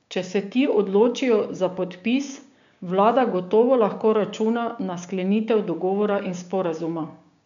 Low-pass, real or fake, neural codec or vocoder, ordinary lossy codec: 7.2 kHz; fake; codec, 16 kHz, 6 kbps, DAC; MP3, 64 kbps